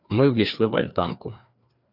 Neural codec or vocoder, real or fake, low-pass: codec, 16 kHz, 2 kbps, FreqCodec, larger model; fake; 5.4 kHz